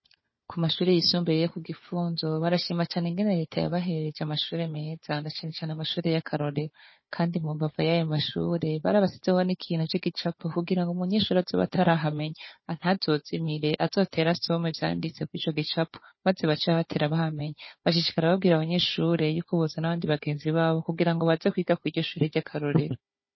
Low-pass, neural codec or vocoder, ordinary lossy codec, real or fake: 7.2 kHz; codec, 16 kHz, 4 kbps, FunCodec, trained on Chinese and English, 50 frames a second; MP3, 24 kbps; fake